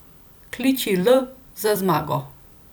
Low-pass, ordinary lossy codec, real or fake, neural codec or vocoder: none; none; fake; vocoder, 44.1 kHz, 128 mel bands every 256 samples, BigVGAN v2